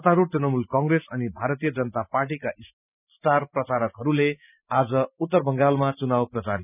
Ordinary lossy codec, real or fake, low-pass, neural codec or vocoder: none; real; 3.6 kHz; none